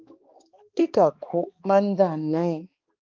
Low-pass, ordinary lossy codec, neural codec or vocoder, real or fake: 7.2 kHz; Opus, 24 kbps; codec, 16 kHz, 2 kbps, X-Codec, HuBERT features, trained on balanced general audio; fake